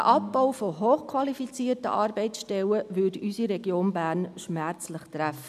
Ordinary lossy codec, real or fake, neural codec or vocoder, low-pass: none; real; none; 14.4 kHz